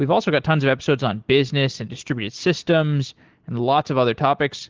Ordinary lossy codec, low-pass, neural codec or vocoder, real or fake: Opus, 16 kbps; 7.2 kHz; none; real